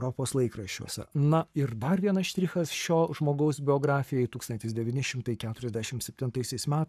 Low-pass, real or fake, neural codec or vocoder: 14.4 kHz; fake; codec, 44.1 kHz, 7.8 kbps, Pupu-Codec